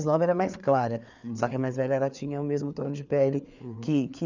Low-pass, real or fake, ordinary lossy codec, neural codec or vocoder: 7.2 kHz; fake; none; codec, 16 kHz, 4 kbps, FreqCodec, larger model